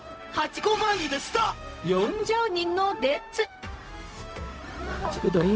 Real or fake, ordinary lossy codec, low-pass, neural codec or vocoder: fake; none; none; codec, 16 kHz, 0.4 kbps, LongCat-Audio-Codec